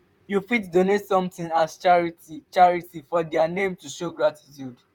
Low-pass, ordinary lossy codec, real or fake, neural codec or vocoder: 19.8 kHz; none; fake; vocoder, 44.1 kHz, 128 mel bands, Pupu-Vocoder